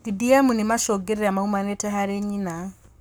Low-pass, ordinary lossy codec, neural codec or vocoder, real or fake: none; none; codec, 44.1 kHz, 7.8 kbps, Pupu-Codec; fake